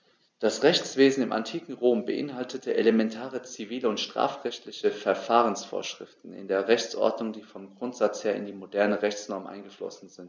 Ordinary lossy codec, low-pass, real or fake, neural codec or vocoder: none; none; real; none